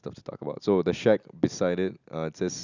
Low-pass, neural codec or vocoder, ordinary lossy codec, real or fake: 7.2 kHz; none; none; real